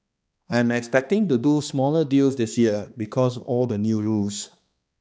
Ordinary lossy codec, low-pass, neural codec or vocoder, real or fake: none; none; codec, 16 kHz, 2 kbps, X-Codec, HuBERT features, trained on balanced general audio; fake